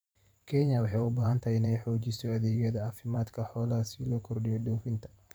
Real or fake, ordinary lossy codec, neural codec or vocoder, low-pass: fake; none; vocoder, 44.1 kHz, 128 mel bands every 512 samples, BigVGAN v2; none